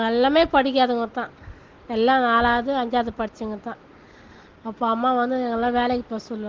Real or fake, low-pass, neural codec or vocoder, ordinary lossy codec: real; 7.2 kHz; none; Opus, 16 kbps